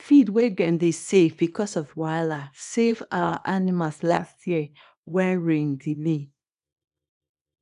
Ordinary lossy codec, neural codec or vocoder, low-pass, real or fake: AAC, 96 kbps; codec, 24 kHz, 0.9 kbps, WavTokenizer, small release; 10.8 kHz; fake